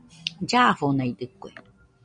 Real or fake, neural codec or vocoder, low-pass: real; none; 9.9 kHz